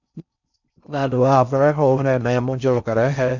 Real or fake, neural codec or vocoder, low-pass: fake; codec, 16 kHz in and 24 kHz out, 0.6 kbps, FocalCodec, streaming, 4096 codes; 7.2 kHz